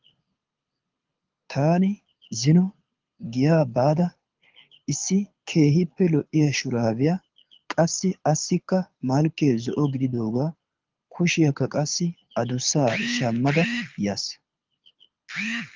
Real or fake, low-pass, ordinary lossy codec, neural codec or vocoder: fake; 7.2 kHz; Opus, 32 kbps; codec, 24 kHz, 6 kbps, HILCodec